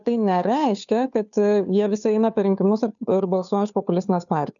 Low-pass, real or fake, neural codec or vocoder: 7.2 kHz; fake; codec, 16 kHz, 2 kbps, FunCodec, trained on Chinese and English, 25 frames a second